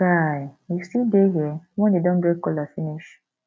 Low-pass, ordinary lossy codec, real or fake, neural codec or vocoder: none; none; real; none